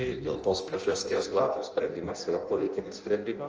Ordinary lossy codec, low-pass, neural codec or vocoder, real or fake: Opus, 24 kbps; 7.2 kHz; codec, 16 kHz in and 24 kHz out, 0.6 kbps, FireRedTTS-2 codec; fake